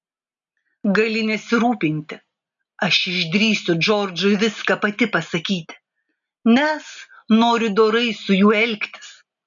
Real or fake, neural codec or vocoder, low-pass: real; none; 7.2 kHz